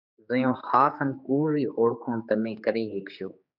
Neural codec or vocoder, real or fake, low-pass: codec, 16 kHz, 4 kbps, X-Codec, HuBERT features, trained on general audio; fake; 5.4 kHz